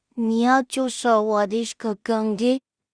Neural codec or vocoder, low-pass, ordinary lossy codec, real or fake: codec, 16 kHz in and 24 kHz out, 0.4 kbps, LongCat-Audio-Codec, two codebook decoder; 9.9 kHz; Opus, 64 kbps; fake